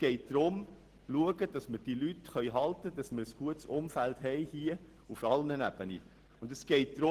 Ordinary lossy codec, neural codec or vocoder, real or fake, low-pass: Opus, 16 kbps; none; real; 14.4 kHz